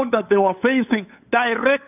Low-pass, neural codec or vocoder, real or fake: 3.6 kHz; codec, 16 kHz, 8 kbps, FunCodec, trained on LibriTTS, 25 frames a second; fake